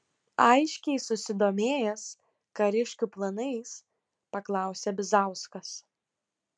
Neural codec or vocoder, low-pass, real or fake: none; 9.9 kHz; real